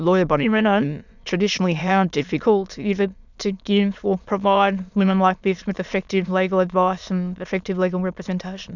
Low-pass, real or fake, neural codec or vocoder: 7.2 kHz; fake; autoencoder, 22.05 kHz, a latent of 192 numbers a frame, VITS, trained on many speakers